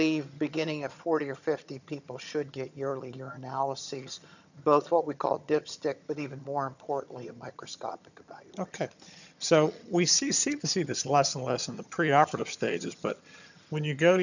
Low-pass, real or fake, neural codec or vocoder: 7.2 kHz; fake; vocoder, 22.05 kHz, 80 mel bands, HiFi-GAN